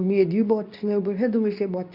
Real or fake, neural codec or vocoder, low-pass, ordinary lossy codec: fake; codec, 24 kHz, 0.9 kbps, WavTokenizer, medium speech release version 2; 5.4 kHz; none